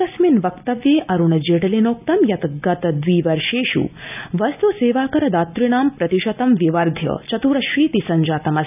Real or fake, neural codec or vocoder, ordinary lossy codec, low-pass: real; none; none; 3.6 kHz